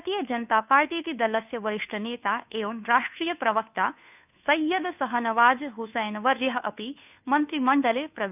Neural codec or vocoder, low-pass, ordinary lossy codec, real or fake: codec, 16 kHz, 2 kbps, FunCodec, trained on Chinese and English, 25 frames a second; 3.6 kHz; none; fake